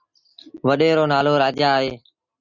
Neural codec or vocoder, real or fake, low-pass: none; real; 7.2 kHz